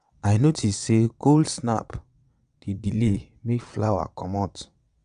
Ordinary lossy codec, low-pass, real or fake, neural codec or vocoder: none; 9.9 kHz; fake; vocoder, 22.05 kHz, 80 mel bands, WaveNeXt